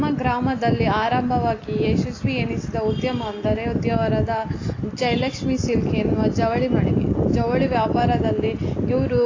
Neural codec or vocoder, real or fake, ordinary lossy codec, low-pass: none; real; AAC, 32 kbps; 7.2 kHz